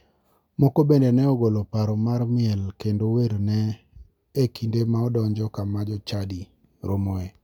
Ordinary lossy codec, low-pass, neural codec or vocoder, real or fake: none; 19.8 kHz; none; real